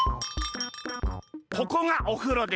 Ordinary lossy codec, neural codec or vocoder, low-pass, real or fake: none; none; none; real